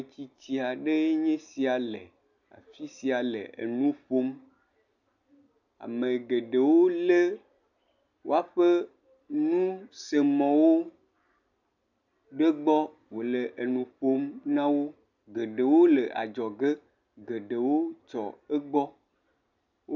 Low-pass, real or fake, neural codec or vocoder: 7.2 kHz; real; none